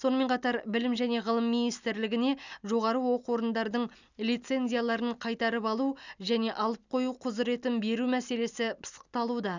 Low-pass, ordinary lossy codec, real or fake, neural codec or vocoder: 7.2 kHz; none; real; none